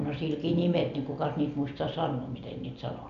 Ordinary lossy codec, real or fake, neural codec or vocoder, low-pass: none; real; none; 7.2 kHz